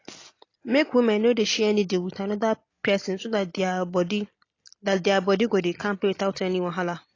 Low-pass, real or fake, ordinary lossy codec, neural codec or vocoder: 7.2 kHz; real; AAC, 32 kbps; none